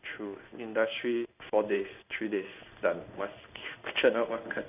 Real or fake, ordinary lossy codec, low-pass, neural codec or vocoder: fake; none; 3.6 kHz; codec, 16 kHz in and 24 kHz out, 1 kbps, XY-Tokenizer